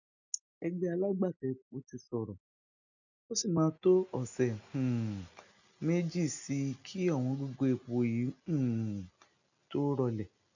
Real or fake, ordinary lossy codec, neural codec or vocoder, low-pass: real; none; none; 7.2 kHz